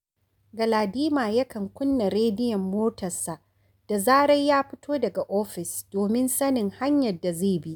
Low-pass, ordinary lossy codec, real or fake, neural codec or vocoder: none; none; real; none